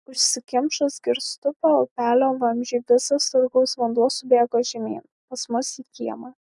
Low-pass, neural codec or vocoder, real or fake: 10.8 kHz; none; real